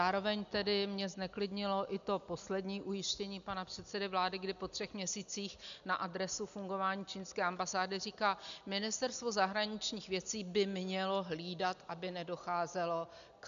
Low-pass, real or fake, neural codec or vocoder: 7.2 kHz; real; none